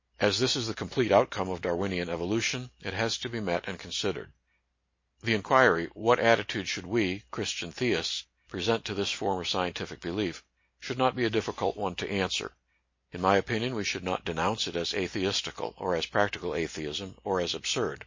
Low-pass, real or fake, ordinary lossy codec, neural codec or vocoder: 7.2 kHz; real; MP3, 32 kbps; none